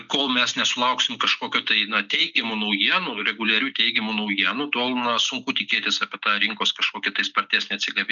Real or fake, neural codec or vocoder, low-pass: real; none; 7.2 kHz